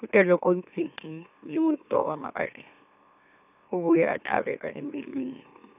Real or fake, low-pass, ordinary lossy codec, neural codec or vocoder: fake; 3.6 kHz; none; autoencoder, 44.1 kHz, a latent of 192 numbers a frame, MeloTTS